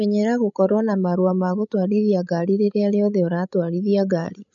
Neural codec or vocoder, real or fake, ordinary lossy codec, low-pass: codec, 16 kHz, 16 kbps, FreqCodec, larger model; fake; none; 7.2 kHz